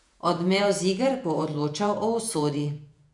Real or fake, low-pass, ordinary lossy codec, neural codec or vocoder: fake; 10.8 kHz; none; vocoder, 48 kHz, 128 mel bands, Vocos